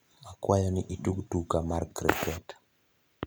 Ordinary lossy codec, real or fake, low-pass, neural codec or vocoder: none; real; none; none